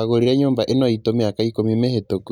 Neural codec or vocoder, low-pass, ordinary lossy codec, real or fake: none; 19.8 kHz; none; real